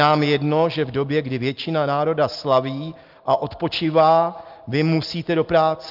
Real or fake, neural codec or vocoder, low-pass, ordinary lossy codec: real; none; 5.4 kHz; Opus, 32 kbps